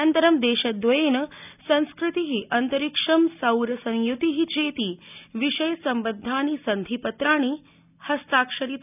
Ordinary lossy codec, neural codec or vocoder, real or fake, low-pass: none; none; real; 3.6 kHz